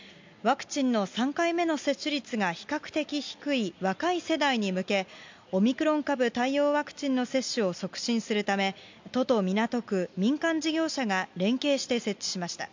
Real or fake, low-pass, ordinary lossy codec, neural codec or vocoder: real; 7.2 kHz; MP3, 64 kbps; none